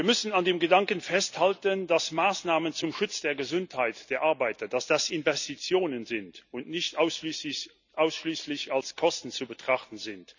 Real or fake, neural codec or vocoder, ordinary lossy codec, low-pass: real; none; none; 7.2 kHz